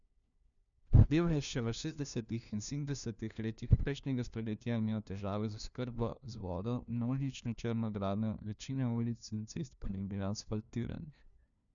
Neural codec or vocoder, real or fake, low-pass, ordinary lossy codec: codec, 16 kHz, 1 kbps, FunCodec, trained on LibriTTS, 50 frames a second; fake; 7.2 kHz; none